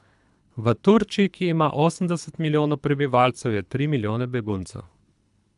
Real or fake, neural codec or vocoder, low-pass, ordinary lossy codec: fake; codec, 24 kHz, 3 kbps, HILCodec; 10.8 kHz; none